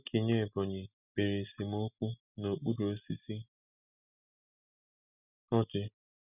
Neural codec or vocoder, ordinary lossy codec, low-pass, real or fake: none; none; 3.6 kHz; real